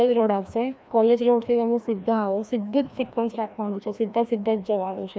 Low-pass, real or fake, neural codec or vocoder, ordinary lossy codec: none; fake; codec, 16 kHz, 1 kbps, FreqCodec, larger model; none